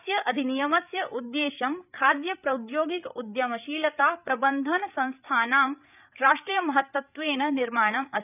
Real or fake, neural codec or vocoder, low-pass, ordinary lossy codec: fake; codec, 16 kHz, 8 kbps, FreqCodec, larger model; 3.6 kHz; none